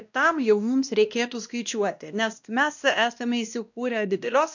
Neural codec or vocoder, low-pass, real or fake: codec, 16 kHz, 1 kbps, X-Codec, WavLM features, trained on Multilingual LibriSpeech; 7.2 kHz; fake